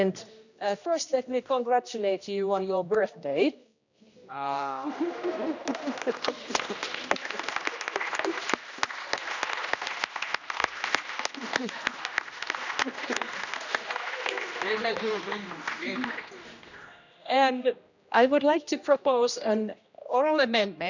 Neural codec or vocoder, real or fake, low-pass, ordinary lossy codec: codec, 16 kHz, 1 kbps, X-Codec, HuBERT features, trained on general audio; fake; 7.2 kHz; none